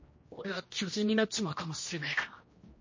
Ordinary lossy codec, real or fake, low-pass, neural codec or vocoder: MP3, 32 kbps; fake; 7.2 kHz; codec, 16 kHz, 1 kbps, X-Codec, HuBERT features, trained on general audio